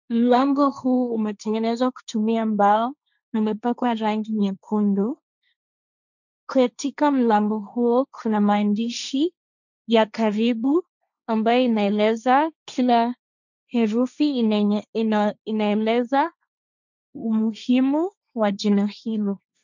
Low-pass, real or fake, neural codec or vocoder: 7.2 kHz; fake; codec, 16 kHz, 1.1 kbps, Voila-Tokenizer